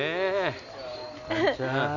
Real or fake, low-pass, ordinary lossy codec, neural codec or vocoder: real; 7.2 kHz; none; none